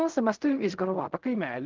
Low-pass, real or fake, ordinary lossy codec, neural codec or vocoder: 7.2 kHz; fake; Opus, 32 kbps; codec, 16 kHz in and 24 kHz out, 0.4 kbps, LongCat-Audio-Codec, fine tuned four codebook decoder